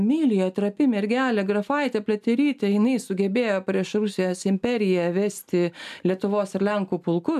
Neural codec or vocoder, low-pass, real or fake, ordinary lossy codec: none; 14.4 kHz; real; AAC, 96 kbps